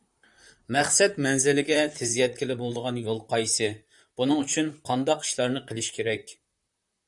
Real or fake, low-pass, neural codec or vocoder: fake; 10.8 kHz; vocoder, 44.1 kHz, 128 mel bands, Pupu-Vocoder